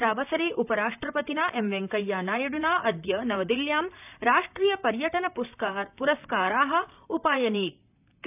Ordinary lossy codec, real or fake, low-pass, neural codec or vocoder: none; fake; 3.6 kHz; vocoder, 44.1 kHz, 128 mel bands, Pupu-Vocoder